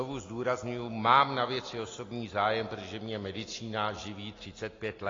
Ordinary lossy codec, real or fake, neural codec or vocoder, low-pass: AAC, 32 kbps; real; none; 7.2 kHz